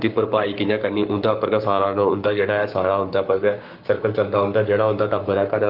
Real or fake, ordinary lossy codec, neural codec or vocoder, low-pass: fake; Opus, 24 kbps; codec, 44.1 kHz, 7.8 kbps, Pupu-Codec; 5.4 kHz